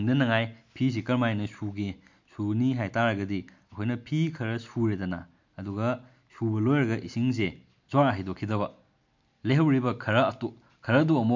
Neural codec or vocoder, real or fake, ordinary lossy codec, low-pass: none; real; MP3, 48 kbps; 7.2 kHz